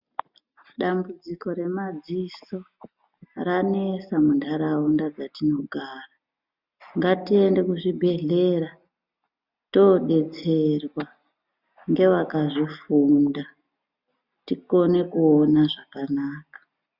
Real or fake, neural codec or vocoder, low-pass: real; none; 5.4 kHz